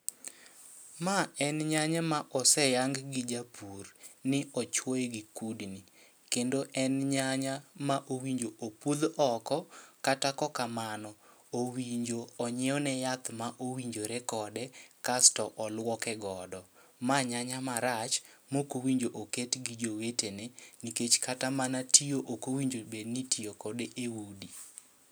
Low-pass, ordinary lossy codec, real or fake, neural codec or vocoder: none; none; real; none